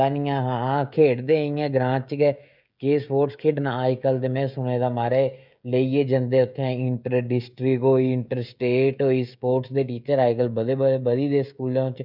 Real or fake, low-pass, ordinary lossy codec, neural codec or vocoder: fake; 5.4 kHz; none; codec, 16 kHz, 16 kbps, FreqCodec, smaller model